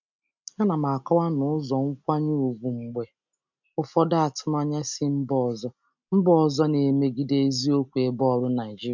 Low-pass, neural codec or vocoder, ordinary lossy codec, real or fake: 7.2 kHz; none; MP3, 64 kbps; real